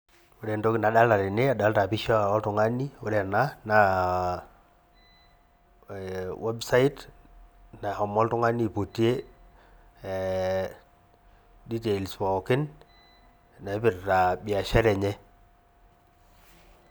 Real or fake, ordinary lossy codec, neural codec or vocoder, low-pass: real; none; none; none